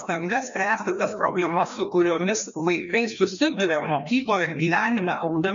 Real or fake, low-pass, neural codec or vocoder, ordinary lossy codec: fake; 7.2 kHz; codec, 16 kHz, 1 kbps, FreqCodec, larger model; MP3, 64 kbps